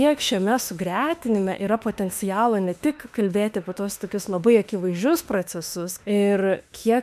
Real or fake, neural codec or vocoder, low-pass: fake; autoencoder, 48 kHz, 32 numbers a frame, DAC-VAE, trained on Japanese speech; 14.4 kHz